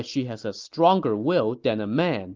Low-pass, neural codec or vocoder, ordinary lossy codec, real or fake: 7.2 kHz; none; Opus, 24 kbps; real